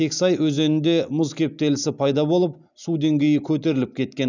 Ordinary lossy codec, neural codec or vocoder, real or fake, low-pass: none; none; real; 7.2 kHz